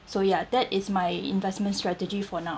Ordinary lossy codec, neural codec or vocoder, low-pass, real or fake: none; none; none; real